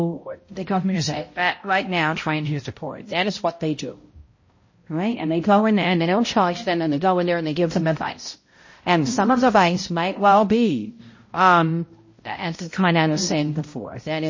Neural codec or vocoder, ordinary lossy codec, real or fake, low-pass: codec, 16 kHz, 0.5 kbps, X-Codec, HuBERT features, trained on balanced general audio; MP3, 32 kbps; fake; 7.2 kHz